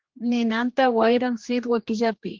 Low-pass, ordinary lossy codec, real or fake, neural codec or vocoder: 7.2 kHz; Opus, 16 kbps; fake; codec, 16 kHz, 2 kbps, X-Codec, HuBERT features, trained on general audio